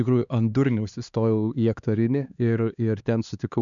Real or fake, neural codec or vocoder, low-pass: fake; codec, 16 kHz, 2 kbps, X-Codec, HuBERT features, trained on LibriSpeech; 7.2 kHz